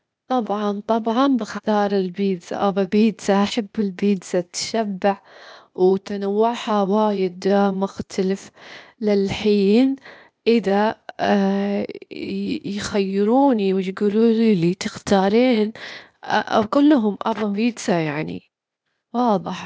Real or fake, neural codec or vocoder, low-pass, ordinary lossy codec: fake; codec, 16 kHz, 0.8 kbps, ZipCodec; none; none